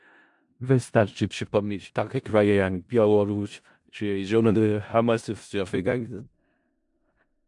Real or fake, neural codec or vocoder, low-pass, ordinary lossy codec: fake; codec, 16 kHz in and 24 kHz out, 0.4 kbps, LongCat-Audio-Codec, four codebook decoder; 10.8 kHz; MP3, 64 kbps